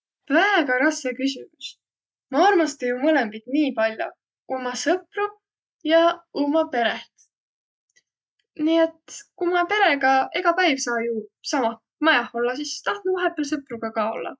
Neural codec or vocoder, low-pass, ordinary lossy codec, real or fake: none; none; none; real